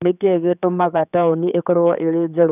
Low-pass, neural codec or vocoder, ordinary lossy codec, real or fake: 3.6 kHz; codec, 16 kHz, 4 kbps, X-Codec, HuBERT features, trained on general audio; none; fake